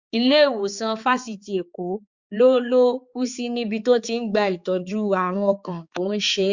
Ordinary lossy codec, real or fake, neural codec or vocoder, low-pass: Opus, 64 kbps; fake; codec, 16 kHz, 4 kbps, X-Codec, HuBERT features, trained on balanced general audio; 7.2 kHz